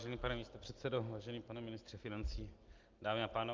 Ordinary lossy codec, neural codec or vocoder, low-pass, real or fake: Opus, 24 kbps; none; 7.2 kHz; real